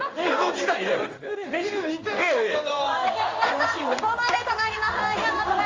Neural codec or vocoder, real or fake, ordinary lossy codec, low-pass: codec, 16 kHz in and 24 kHz out, 1 kbps, XY-Tokenizer; fake; Opus, 32 kbps; 7.2 kHz